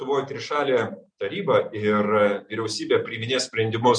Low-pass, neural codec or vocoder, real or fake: 9.9 kHz; none; real